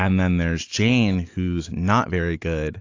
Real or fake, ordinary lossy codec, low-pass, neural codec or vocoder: real; AAC, 48 kbps; 7.2 kHz; none